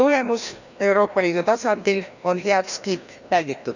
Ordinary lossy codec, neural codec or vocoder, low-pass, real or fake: none; codec, 16 kHz, 1 kbps, FreqCodec, larger model; 7.2 kHz; fake